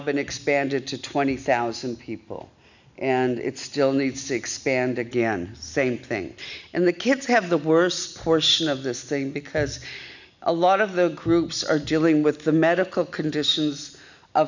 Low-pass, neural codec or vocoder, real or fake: 7.2 kHz; none; real